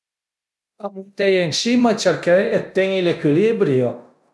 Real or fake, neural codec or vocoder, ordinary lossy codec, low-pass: fake; codec, 24 kHz, 0.9 kbps, DualCodec; none; none